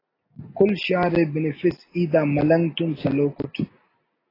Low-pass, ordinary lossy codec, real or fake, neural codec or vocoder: 5.4 kHz; AAC, 24 kbps; real; none